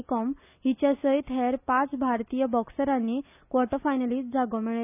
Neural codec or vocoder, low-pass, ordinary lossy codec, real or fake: none; 3.6 kHz; none; real